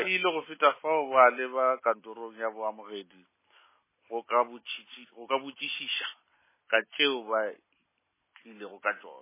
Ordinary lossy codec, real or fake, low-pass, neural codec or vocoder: MP3, 16 kbps; real; 3.6 kHz; none